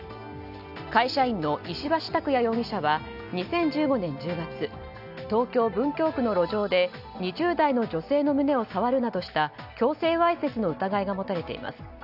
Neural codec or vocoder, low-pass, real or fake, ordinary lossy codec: none; 5.4 kHz; real; none